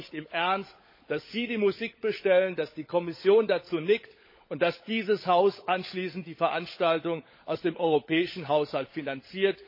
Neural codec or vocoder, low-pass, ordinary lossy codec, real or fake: codec, 16 kHz, 16 kbps, FunCodec, trained on LibriTTS, 50 frames a second; 5.4 kHz; MP3, 24 kbps; fake